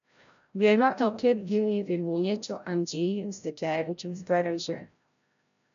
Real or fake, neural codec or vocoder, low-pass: fake; codec, 16 kHz, 0.5 kbps, FreqCodec, larger model; 7.2 kHz